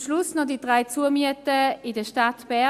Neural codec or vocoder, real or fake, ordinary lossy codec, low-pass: none; real; Opus, 64 kbps; 14.4 kHz